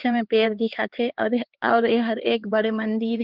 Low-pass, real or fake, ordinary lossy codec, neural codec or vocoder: 5.4 kHz; fake; Opus, 16 kbps; codec, 16 kHz, 4 kbps, X-Codec, HuBERT features, trained on LibriSpeech